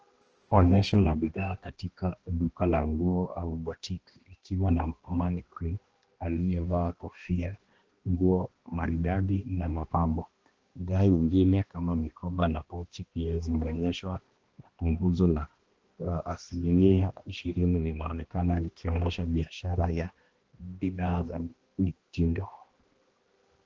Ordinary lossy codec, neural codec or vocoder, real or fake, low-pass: Opus, 16 kbps; codec, 16 kHz, 1 kbps, X-Codec, HuBERT features, trained on balanced general audio; fake; 7.2 kHz